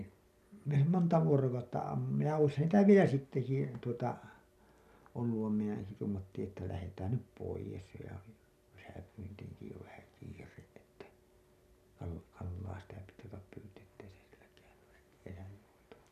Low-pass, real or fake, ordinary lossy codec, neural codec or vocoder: 14.4 kHz; real; none; none